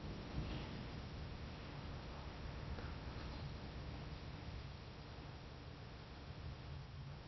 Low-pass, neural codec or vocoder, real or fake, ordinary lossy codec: 7.2 kHz; codec, 16 kHz in and 24 kHz out, 0.6 kbps, FocalCodec, streaming, 4096 codes; fake; MP3, 24 kbps